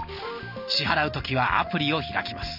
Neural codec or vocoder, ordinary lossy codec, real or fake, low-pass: none; AAC, 48 kbps; real; 5.4 kHz